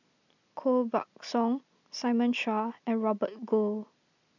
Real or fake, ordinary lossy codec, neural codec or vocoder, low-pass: real; none; none; 7.2 kHz